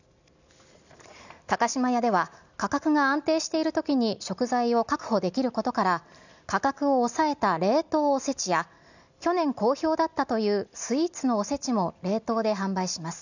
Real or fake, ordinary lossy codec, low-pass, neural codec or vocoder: real; none; 7.2 kHz; none